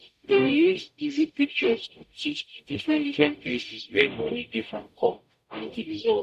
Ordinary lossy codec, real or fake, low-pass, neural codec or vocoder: none; fake; 14.4 kHz; codec, 44.1 kHz, 0.9 kbps, DAC